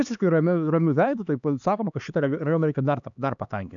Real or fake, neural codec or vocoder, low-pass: fake; codec, 16 kHz, 2 kbps, X-Codec, HuBERT features, trained on LibriSpeech; 7.2 kHz